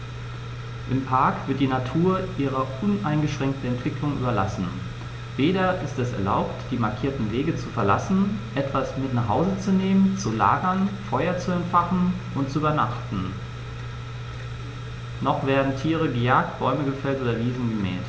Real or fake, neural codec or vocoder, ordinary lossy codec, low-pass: real; none; none; none